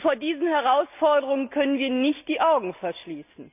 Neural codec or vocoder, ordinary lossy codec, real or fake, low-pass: none; none; real; 3.6 kHz